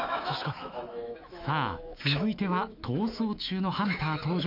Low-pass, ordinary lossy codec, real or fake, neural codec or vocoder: 5.4 kHz; MP3, 48 kbps; fake; autoencoder, 48 kHz, 128 numbers a frame, DAC-VAE, trained on Japanese speech